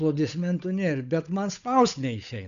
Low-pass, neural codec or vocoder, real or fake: 7.2 kHz; codec, 16 kHz, 8 kbps, FunCodec, trained on Chinese and English, 25 frames a second; fake